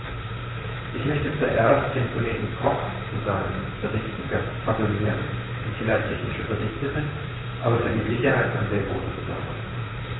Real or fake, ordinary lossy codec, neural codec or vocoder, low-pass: fake; AAC, 16 kbps; vocoder, 44.1 kHz, 128 mel bands, Pupu-Vocoder; 7.2 kHz